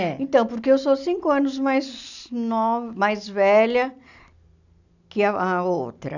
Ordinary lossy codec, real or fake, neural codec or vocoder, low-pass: none; real; none; 7.2 kHz